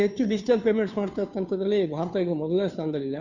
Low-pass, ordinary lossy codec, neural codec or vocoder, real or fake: 7.2 kHz; none; codec, 16 kHz, 2 kbps, FunCodec, trained on Chinese and English, 25 frames a second; fake